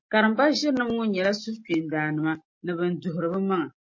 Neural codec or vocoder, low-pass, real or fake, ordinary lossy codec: none; 7.2 kHz; real; MP3, 32 kbps